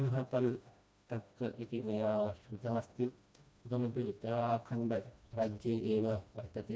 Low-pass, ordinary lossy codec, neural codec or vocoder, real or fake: none; none; codec, 16 kHz, 1 kbps, FreqCodec, smaller model; fake